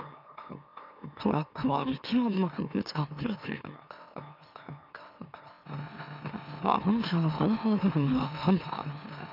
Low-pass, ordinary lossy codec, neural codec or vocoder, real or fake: 5.4 kHz; none; autoencoder, 44.1 kHz, a latent of 192 numbers a frame, MeloTTS; fake